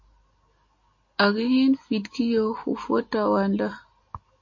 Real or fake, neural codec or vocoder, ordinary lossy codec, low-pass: real; none; MP3, 32 kbps; 7.2 kHz